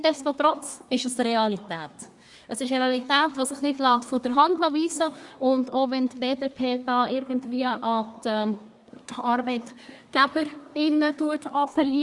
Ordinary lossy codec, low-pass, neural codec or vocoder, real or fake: Opus, 64 kbps; 10.8 kHz; codec, 24 kHz, 1 kbps, SNAC; fake